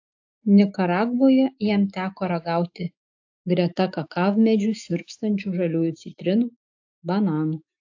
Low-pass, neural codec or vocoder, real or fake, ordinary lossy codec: 7.2 kHz; autoencoder, 48 kHz, 128 numbers a frame, DAC-VAE, trained on Japanese speech; fake; AAC, 48 kbps